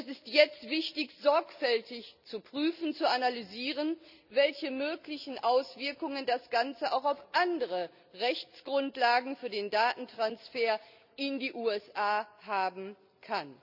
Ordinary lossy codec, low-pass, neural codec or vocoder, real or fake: none; 5.4 kHz; none; real